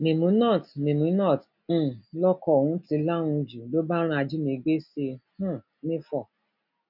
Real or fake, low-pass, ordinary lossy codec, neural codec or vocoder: real; 5.4 kHz; none; none